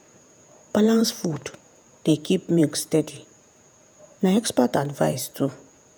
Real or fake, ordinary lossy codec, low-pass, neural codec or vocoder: fake; none; none; vocoder, 48 kHz, 128 mel bands, Vocos